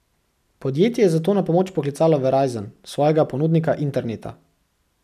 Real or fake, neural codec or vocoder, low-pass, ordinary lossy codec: real; none; 14.4 kHz; none